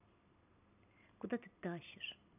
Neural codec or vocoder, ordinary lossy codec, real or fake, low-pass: none; none; real; 3.6 kHz